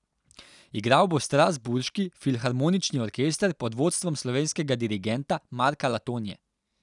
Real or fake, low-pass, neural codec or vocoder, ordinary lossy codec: real; 10.8 kHz; none; none